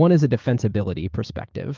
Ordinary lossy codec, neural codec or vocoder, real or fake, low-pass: Opus, 32 kbps; codec, 16 kHz, 6 kbps, DAC; fake; 7.2 kHz